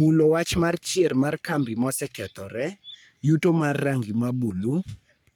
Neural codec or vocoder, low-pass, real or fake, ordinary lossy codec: codec, 44.1 kHz, 3.4 kbps, Pupu-Codec; none; fake; none